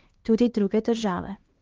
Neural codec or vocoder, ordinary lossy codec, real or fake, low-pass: codec, 16 kHz, 2 kbps, X-Codec, HuBERT features, trained on LibriSpeech; Opus, 16 kbps; fake; 7.2 kHz